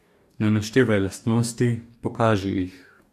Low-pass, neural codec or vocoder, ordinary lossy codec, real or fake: 14.4 kHz; codec, 44.1 kHz, 2.6 kbps, DAC; AAC, 96 kbps; fake